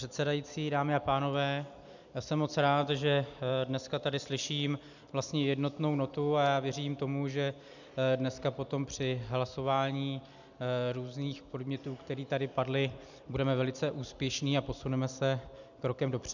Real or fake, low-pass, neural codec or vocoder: real; 7.2 kHz; none